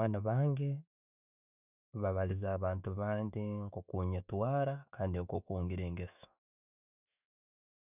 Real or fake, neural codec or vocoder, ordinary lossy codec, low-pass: fake; autoencoder, 48 kHz, 128 numbers a frame, DAC-VAE, trained on Japanese speech; none; 3.6 kHz